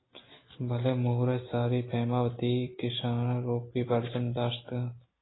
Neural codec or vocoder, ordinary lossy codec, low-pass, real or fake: none; AAC, 16 kbps; 7.2 kHz; real